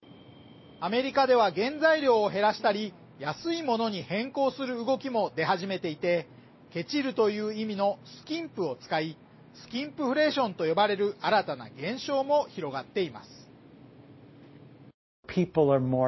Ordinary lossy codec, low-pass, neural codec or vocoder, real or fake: MP3, 24 kbps; 7.2 kHz; none; real